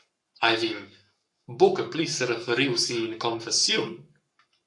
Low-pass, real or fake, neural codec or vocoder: 10.8 kHz; fake; codec, 44.1 kHz, 7.8 kbps, Pupu-Codec